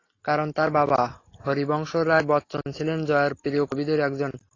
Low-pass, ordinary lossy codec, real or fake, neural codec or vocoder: 7.2 kHz; AAC, 32 kbps; real; none